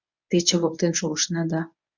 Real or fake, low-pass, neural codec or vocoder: fake; 7.2 kHz; codec, 24 kHz, 0.9 kbps, WavTokenizer, medium speech release version 1